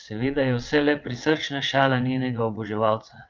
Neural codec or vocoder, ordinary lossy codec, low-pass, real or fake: vocoder, 22.05 kHz, 80 mel bands, WaveNeXt; Opus, 24 kbps; 7.2 kHz; fake